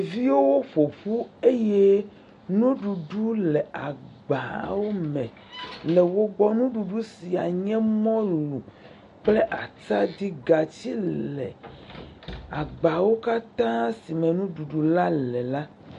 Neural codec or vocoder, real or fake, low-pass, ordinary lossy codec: none; real; 14.4 kHz; MP3, 48 kbps